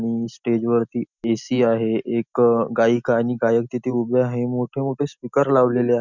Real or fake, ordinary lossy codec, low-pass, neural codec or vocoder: real; none; 7.2 kHz; none